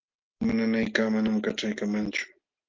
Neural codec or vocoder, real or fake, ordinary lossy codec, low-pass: none; real; Opus, 32 kbps; 7.2 kHz